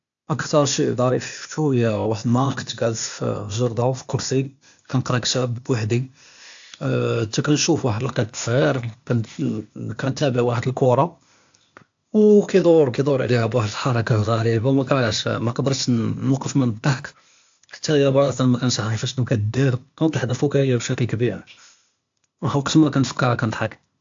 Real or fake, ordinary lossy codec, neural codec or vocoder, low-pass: fake; AAC, 64 kbps; codec, 16 kHz, 0.8 kbps, ZipCodec; 7.2 kHz